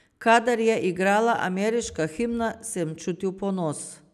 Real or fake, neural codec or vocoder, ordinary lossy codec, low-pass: real; none; none; 14.4 kHz